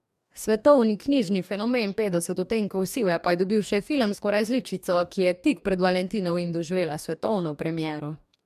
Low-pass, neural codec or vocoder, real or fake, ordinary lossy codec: 14.4 kHz; codec, 44.1 kHz, 2.6 kbps, DAC; fake; MP3, 96 kbps